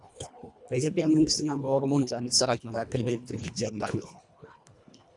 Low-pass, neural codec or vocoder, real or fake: 10.8 kHz; codec, 24 kHz, 1.5 kbps, HILCodec; fake